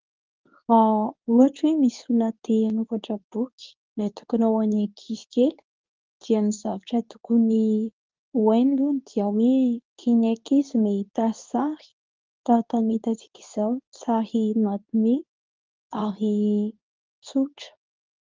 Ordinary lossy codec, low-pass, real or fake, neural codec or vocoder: Opus, 32 kbps; 7.2 kHz; fake; codec, 24 kHz, 0.9 kbps, WavTokenizer, medium speech release version 2